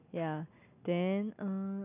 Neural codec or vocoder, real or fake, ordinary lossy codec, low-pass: none; real; none; 3.6 kHz